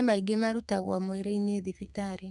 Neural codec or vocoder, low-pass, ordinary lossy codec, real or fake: codec, 32 kHz, 1.9 kbps, SNAC; 10.8 kHz; none; fake